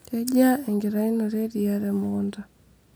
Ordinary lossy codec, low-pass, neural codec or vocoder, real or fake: none; none; none; real